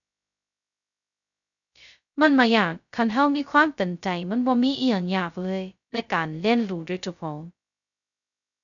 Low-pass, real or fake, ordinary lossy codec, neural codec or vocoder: 7.2 kHz; fake; none; codec, 16 kHz, 0.2 kbps, FocalCodec